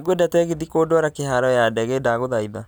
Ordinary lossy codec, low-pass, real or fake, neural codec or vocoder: none; none; real; none